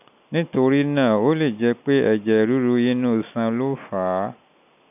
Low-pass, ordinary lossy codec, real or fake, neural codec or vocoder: 3.6 kHz; none; real; none